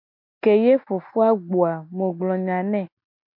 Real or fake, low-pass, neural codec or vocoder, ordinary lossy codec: real; 5.4 kHz; none; MP3, 48 kbps